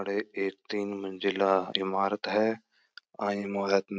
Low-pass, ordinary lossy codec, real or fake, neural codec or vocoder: none; none; real; none